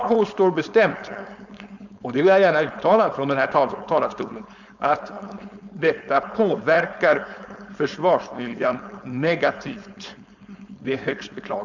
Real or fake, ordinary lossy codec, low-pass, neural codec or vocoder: fake; none; 7.2 kHz; codec, 16 kHz, 4.8 kbps, FACodec